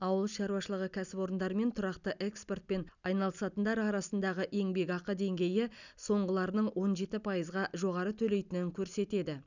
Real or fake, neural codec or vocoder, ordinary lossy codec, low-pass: real; none; none; 7.2 kHz